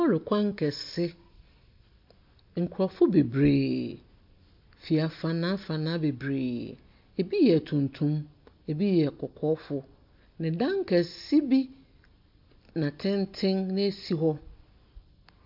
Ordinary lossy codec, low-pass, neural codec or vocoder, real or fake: MP3, 48 kbps; 5.4 kHz; none; real